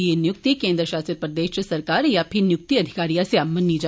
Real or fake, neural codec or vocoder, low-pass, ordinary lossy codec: real; none; none; none